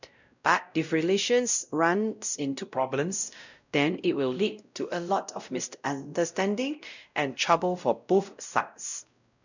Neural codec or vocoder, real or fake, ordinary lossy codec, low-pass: codec, 16 kHz, 0.5 kbps, X-Codec, WavLM features, trained on Multilingual LibriSpeech; fake; none; 7.2 kHz